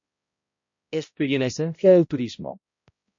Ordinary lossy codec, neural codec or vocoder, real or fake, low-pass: MP3, 48 kbps; codec, 16 kHz, 0.5 kbps, X-Codec, HuBERT features, trained on balanced general audio; fake; 7.2 kHz